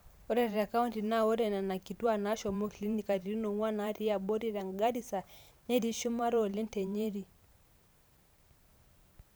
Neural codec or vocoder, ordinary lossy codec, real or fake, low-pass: vocoder, 44.1 kHz, 128 mel bands every 512 samples, BigVGAN v2; none; fake; none